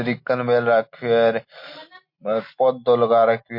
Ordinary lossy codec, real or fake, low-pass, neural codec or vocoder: MP3, 32 kbps; real; 5.4 kHz; none